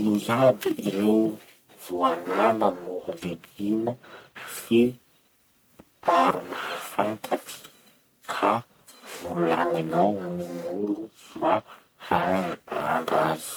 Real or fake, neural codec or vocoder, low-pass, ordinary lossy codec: fake; codec, 44.1 kHz, 1.7 kbps, Pupu-Codec; none; none